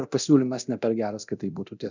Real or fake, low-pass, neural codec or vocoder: fake; 7.2 kHz; codec, 24 kHz, 0.9 kbps, DualCodec